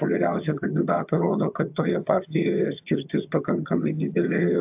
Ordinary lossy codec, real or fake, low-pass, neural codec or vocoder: AAC, 32 kbps; fake; 3.6 kHz; vocoder, 22.05 kHz, 80 mel bands, HiFi-GAN